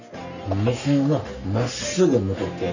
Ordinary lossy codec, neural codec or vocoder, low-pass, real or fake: none; codec, 44.1 kHz, 3.4 kbps, Pupu-Codec; 7.2 kHz; fake